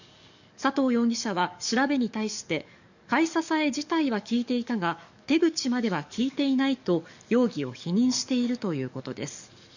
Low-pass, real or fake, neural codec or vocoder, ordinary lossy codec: 7.2 kHz; fake; codec, 44.1 kHz, 7.8 kbps, DAC; none